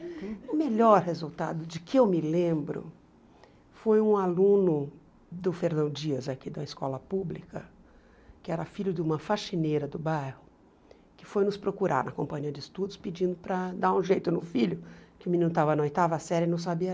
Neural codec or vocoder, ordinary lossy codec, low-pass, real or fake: none; none; none; real